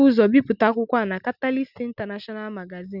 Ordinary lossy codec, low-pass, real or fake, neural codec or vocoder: none; 5.4 kHz; real; none